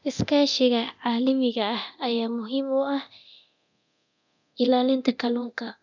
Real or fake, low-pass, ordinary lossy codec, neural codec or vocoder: fake; 7.2 kHz; none; codec, 24 kHz, 0.9 kbps, DualCodec